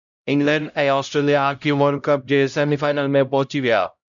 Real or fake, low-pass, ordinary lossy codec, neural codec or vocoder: fake; 7.2 kHz; MP3, 96 kbps; codec, 16 kHz, 0.5 kbps, X-Codec, HuBERT features, trained on LibriSpeech